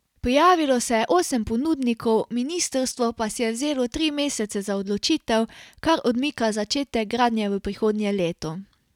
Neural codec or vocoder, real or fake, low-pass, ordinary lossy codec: none; real; 19.8 kHz; none